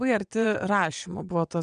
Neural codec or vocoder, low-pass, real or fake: vocoder, 22.05 kHz, 80 mel bands, WaveNeXt; 9.9 kHz; fake